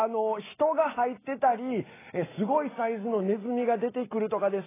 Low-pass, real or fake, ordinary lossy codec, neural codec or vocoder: 3.6 kHz; real; AAC, 16 kbps; none